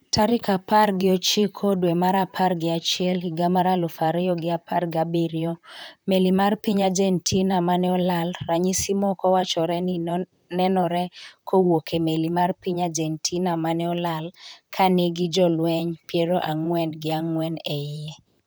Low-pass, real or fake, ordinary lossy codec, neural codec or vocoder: none; fake; none; vocoder, 44.1 kHz, 128 mel bands, Pupu-Vocoder